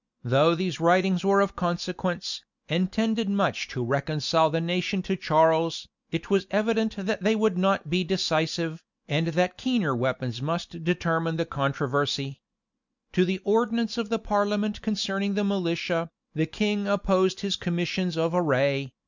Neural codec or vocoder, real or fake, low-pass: none; real; 7.2 kHz